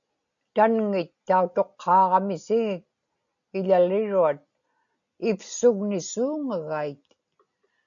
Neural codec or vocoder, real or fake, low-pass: none; real; 7.2 kHz